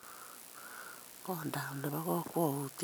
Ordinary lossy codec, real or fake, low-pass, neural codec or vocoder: none; real; none; none